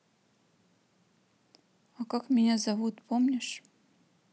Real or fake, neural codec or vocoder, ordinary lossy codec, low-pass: real; none; none; none